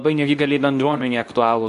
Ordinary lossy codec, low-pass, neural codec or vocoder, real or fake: AAC, 64 kbps; 10.8 kHz; codec, 24 kHz, 0.9 kbps, WavTokenizer, medium speech release version 2; fake